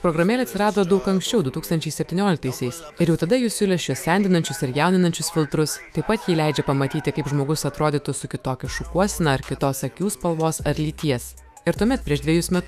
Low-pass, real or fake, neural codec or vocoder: 14.4 kHz; fake; autoencoder, 48 kHz, 128 numbers a frame, DAC-VAE, trained on Japanese speech